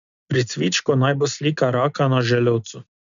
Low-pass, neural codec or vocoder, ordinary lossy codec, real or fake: 7.2 kHz; none; none; real